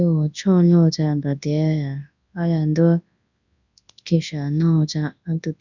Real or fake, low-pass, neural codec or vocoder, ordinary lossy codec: fake; 7.2 kHz; codec, 24 kHz, 0.9 kbps, WavTokenizer, large speech release; none